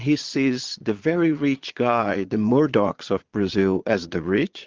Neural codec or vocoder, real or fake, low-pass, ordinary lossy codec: vocoder, 22.05 kHz, 80 mel bands, Vocos; fake; 7.2 kHz; Opus, 32 kbps